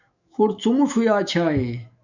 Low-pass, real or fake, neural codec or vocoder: 7.2 kHz; fake; autoencoder, 48 kHz, 128 numbers a frame, DAC-VAE, trained on Japanese speech